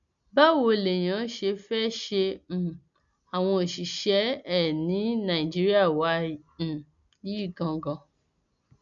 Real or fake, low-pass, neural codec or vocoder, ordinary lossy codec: real; 7.2 kHz; none; none